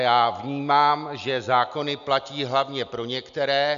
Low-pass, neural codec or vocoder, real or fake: 7.2 kHz; none; real